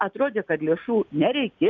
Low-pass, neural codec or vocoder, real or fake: 7.2 kHz; none; real